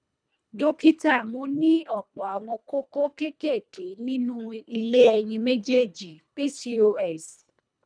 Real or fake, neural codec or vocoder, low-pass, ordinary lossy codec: fake; codec, 24 kHz, 1.5 kbps, HILCodec; 9.9 kHz; none